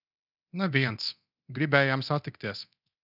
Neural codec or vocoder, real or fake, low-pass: codec, 24 kHz, 0.9 kbps, DualCodec; fake; 5.4 kHz